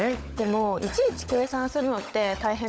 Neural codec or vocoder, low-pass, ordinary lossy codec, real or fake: codec, 16 kHz, 16 kbps, FunCodec, trained on LibriTTS, 50 frames a second; none; none; fake